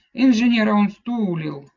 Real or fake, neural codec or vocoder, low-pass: real; none; 7.2 kHz